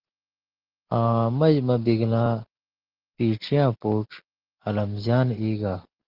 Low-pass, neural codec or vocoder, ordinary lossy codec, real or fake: 5.4 kHz; none; Opus, 16 kbps; real